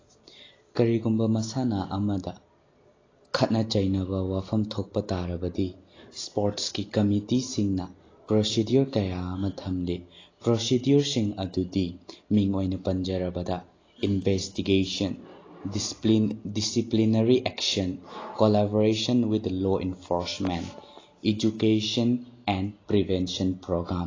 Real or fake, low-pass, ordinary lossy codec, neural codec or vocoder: real; 7.2 kHz; AAC, 32 kbps; none